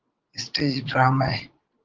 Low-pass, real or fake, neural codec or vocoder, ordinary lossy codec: 7.2 kHz; fake; vocoder, 44.1 kHz, 80 mel bands, Vocos; Opus, 16 kbps